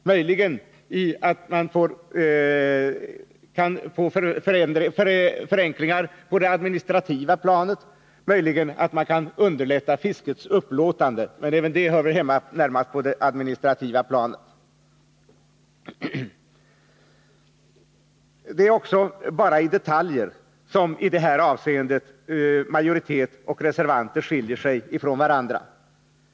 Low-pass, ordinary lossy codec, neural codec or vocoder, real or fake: none; none; none; real